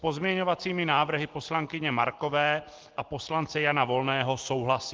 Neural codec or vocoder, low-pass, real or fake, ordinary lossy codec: none; 7.2 kHz; real; Opus, 16 kbps